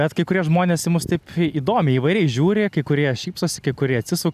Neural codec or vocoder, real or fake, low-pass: none; real; 14.4 kHz